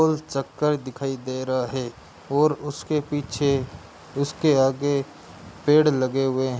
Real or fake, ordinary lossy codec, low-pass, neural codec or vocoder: real; none; none; none